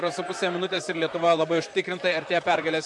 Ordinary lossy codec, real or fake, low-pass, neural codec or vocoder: MP3, 64 kbps; fake; 10.8 kHz; vocoder, 44.1 kHz, 128 mel bands, Pupu-Vocoder